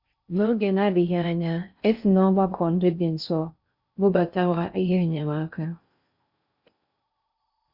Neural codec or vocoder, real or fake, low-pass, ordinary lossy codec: codec, 16 kHz in and 24 kHz out, 0.6 kbps, FocalCodec, streaming, 4096 codes; fake; 5.4 kHz; Opus, 64 kbps